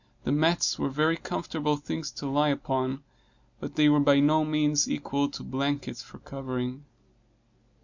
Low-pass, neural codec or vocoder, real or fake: 7.2 kHz; none; real